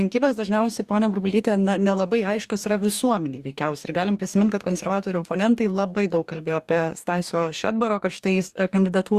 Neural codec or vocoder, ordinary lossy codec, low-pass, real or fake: codec, 44.1 kHz, 2.6 kbps, DAC; Opus, 64 kbps; 14.4 kHz; fake